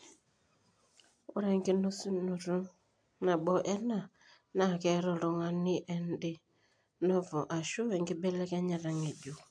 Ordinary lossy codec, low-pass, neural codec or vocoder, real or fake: AAC, 64 kbps; 9.9 kHz; none; real